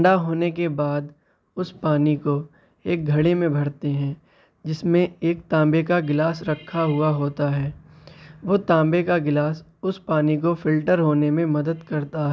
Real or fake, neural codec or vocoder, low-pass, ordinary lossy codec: real; none; none; none